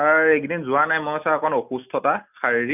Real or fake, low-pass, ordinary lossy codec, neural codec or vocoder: real; 3.6 kHz; none; none